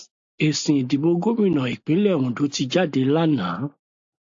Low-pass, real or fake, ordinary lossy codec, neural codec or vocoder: 7.2 kHz; real; AAC, 64 kbps; none